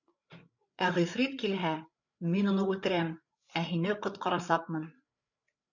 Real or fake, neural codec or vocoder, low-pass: fake; codec, 16 kHz, 8 kbps, FreqCodec, larger model; 7.2 kHz